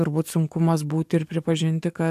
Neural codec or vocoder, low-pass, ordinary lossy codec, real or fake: codec, 44.1 kHz, 7.8 kbps, DAC; 14.4 kHz; AAC, 96 kbps; fake